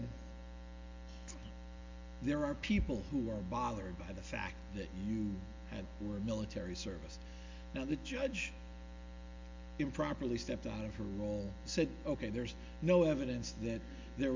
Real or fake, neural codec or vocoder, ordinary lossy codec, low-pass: real; none; MP3, 64 kbps; 7.2 kHz